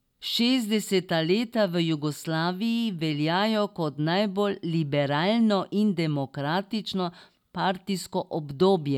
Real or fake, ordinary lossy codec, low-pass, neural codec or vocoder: real; none; 19.8 kHz; none